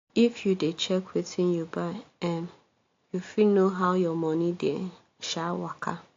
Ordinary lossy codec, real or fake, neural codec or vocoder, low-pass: AAC, 48 kbps; real; none; 7.2 kHz